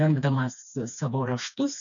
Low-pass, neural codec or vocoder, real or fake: 7.2 kHz; codec, 16 kHz, 2 kbps, FreqCodec, smaller model; fake